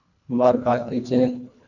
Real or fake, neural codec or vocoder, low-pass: fake; codec, 24 kHz, 1.5 kbps, HILCodec; 7.2 kHz